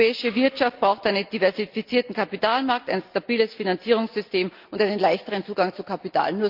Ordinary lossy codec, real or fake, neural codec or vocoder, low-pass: Opus, 32 kbps; real; none; 5.4 kHz